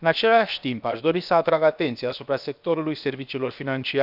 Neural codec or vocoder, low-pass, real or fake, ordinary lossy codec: codec, 16 kHz, 0.7 kbps, FocalCodec; 5.4 kHz; fake; none